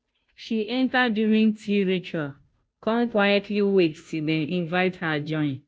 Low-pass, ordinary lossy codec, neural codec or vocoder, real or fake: none; none; codec, 16 kHz, 0.5 kbps, FunCodec, trained on Chinese and English, 25 frames a second; fake